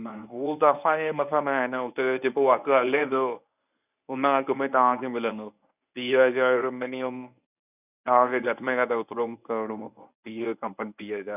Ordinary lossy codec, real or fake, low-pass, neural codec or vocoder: none; fake; 3.6 kHz; codec, 24 kHz, 0.9 kbps, WavTokenizer, medium speech release version 1